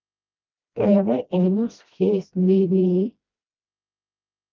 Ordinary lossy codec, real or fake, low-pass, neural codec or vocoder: Opus, 24 kbps; fake; 7.2 kHz; codec, 16 kHz, 1 kbps, FreqCodec, smaller model